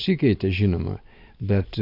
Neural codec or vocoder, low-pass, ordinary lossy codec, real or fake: none; 5.4 kHz; AAC, 32 kbps; real